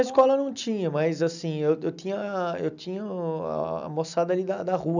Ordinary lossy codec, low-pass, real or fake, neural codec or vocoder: none; 7.2 kHz; real; none